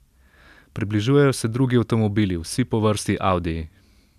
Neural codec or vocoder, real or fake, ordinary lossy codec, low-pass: none; real; none; 14.4 kHz